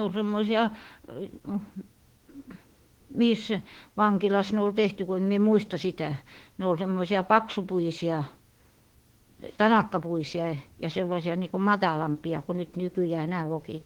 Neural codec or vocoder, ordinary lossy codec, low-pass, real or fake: autoencoder, 48 kHz, 32 numbers a frame, DAC-VAE, trained on Japanese speech; Opus, 16 kbps; 19.8 kHz; fake